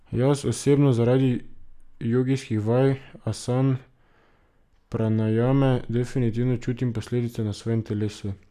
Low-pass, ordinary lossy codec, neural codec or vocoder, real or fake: 14.4 kHz; none; none; real